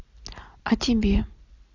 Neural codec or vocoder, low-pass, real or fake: none; 7.2 kHz; real